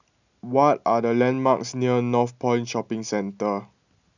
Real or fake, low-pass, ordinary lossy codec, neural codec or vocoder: real; 7.2 kHz; none; none